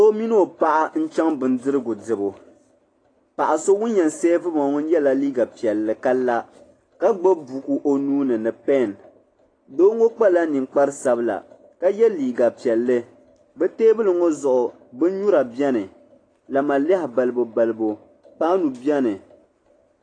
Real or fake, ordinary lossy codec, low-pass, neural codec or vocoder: real; AAC, 32 kbps; 9.9 kHz; none